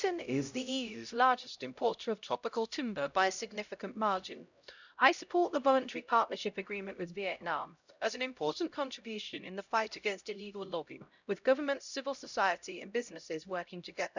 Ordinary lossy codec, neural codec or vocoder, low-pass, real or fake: none; codec, 16 kHz, 0.5 kbps, X-Codec, HuBERT features, trained on LibriSpeech; 7.2 kHz; fake